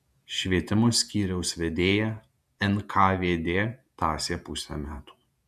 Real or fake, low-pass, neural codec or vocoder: fake; 14.4 kHz; vocoder, 48 kHz, 128 mel bands, Vocos